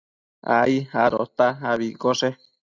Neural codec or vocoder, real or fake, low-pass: none; real; 7.2 kHz